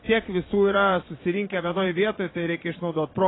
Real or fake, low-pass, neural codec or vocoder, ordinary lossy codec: fake; 7.2 kHz; vocoder, 22.05 kHz, 80 mel bands, Vocos; AAC, 16 kbps